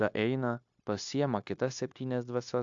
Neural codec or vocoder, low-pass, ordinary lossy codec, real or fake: codec, 16 kHz, 0.9 kbps, LongCat-Audio-Codec; 7.2 kHz; AAC, 64 kbps; fake